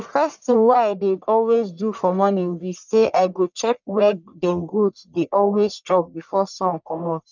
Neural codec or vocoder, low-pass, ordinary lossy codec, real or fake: codec, 44.1 kHz, 1.7 kbps, Pupu-Codec; 7.2 kHz; none; fake